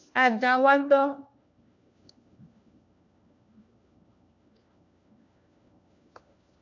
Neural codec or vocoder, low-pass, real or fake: codec, 16 kHz, 1 kbps, FunCodec, trained on LibriTTS, 50 frames a second; 7.2 kHz; fake